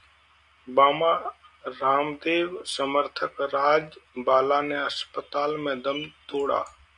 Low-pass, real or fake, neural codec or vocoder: 10.8 kHz; real; none